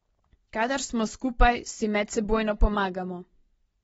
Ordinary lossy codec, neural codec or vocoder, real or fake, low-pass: AAC, 24 kbps; none; real; 19.8 kHz